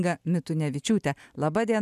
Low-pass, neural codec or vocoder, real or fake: 14.4 kHz; none; real